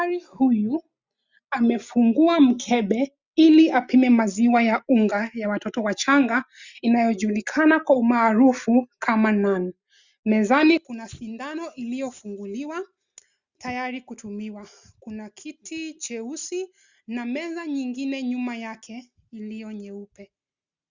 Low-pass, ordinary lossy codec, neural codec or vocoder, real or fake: 7.2 kHz; Opus, 64 kbps; none; real